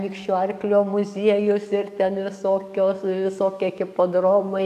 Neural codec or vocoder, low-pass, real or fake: codec, 44.1 kHz, 7.8 kbps, DAC; 14.4 kHz; fake